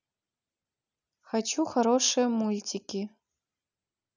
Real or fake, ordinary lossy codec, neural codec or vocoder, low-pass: real; none; none; 7.2 kHz